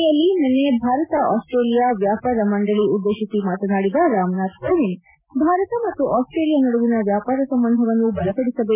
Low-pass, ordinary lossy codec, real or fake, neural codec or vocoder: 3.6 kHz; MP3, 32 kbps; real; none